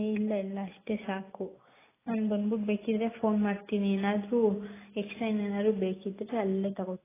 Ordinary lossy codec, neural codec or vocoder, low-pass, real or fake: AAC, 16 kbps; none; 3.6 kHz; real